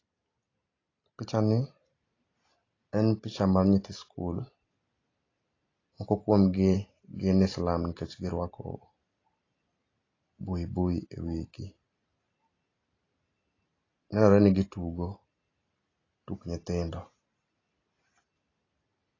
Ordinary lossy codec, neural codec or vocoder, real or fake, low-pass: AAC, 32 kbps; none; real; 7.2 kHz